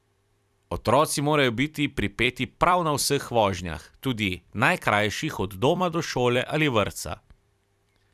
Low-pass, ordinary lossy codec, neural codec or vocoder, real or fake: 14.4 kHz; none; vocoder, 44.1 kHz, 128 mel bands every 256 samples, BigVGAN v2; fake